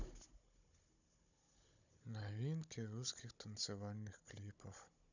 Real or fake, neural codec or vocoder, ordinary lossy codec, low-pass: fake; codec, 16 kHz, 16 kbps, FunCodec, trained on Chinese and English, 50 frames a second; none; 7.2 kHz